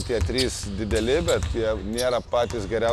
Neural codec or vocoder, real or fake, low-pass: none; real; 14.4 kHz